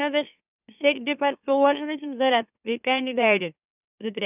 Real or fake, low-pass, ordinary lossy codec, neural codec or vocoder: fake; 3.6 kHz; none; autoencoder, 44.1 kHz, a latent of 192 numbers a frame, MeloTTS